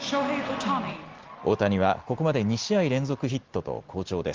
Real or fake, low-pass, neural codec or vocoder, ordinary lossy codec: real; 7.2 kHz; none; Opus, 16 kbps